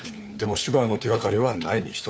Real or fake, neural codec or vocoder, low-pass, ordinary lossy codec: fake; codec, 16 kHz, 4 kbps, FunCodec, trained on LibriTTS, 50 frames a second; none; none